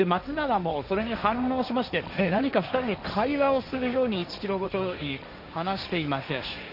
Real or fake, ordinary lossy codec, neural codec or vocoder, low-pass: fake; none; codec, 16 kHz, 1.1 kbps, Voila-Tokenizer; 5.4 kHz